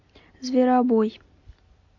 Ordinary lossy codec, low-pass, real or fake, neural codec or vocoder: MP3, 48 kbps; 7.2 kHz; real; none